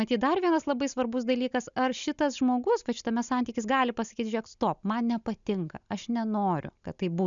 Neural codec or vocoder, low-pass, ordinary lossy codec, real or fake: none; 7.2 kHz; MP3, 96 kbps; real